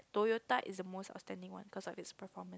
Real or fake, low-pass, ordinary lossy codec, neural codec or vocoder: real; none; none; none